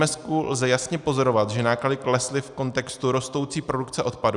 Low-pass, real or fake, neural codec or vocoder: 10.8 kHz; real; none